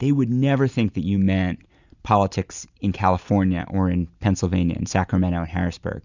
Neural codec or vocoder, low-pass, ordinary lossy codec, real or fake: none; 7.2 kHz; Opus, 64 kbps; real